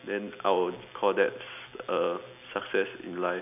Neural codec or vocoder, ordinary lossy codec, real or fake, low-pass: none; none; real; 3.6 kHz